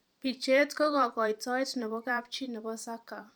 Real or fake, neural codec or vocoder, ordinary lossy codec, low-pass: fake; vocoder, 44.1 kHz, 128 mel bands every 512 samples, BigVGAN v2; none; none